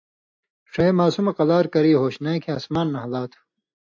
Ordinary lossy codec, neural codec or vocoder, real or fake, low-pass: AAC, 48 kbps; none; real; 7.2 kHz